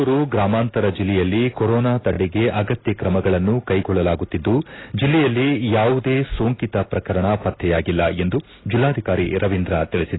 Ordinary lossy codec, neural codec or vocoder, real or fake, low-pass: AAC, 16 kbps; none; real; 7.2 kHz